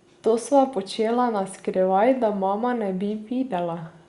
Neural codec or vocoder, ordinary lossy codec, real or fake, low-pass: none; Opus, 64 kbps; real; 10.8 kHz